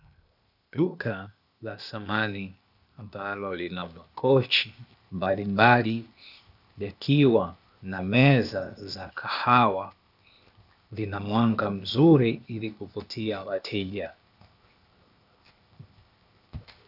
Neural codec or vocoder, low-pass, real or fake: codec, 16 kHz, 0.8 kbps, ZipCodec; 5.4 kHz; fake